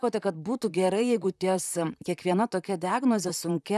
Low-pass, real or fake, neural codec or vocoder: 14.4 kHz; fake; vocoder, 44.1 kHz, 128 mel bands, Pupu-Vocoder